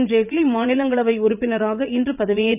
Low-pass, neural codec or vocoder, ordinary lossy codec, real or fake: 3.6 kHz; vocoder, 44.1 kHz, 80 mel bands, Vocos; none; fake